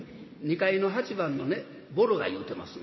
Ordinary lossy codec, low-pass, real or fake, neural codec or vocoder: MP3, 24 kbps; 7.2 kHz; real; none